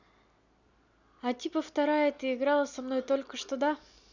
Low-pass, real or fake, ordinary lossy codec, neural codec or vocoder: 7.2 kHz; real; none; none